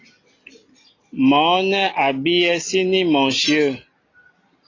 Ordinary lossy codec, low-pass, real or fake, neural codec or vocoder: AAC, 32 kbps; 7.2 kHz; real; none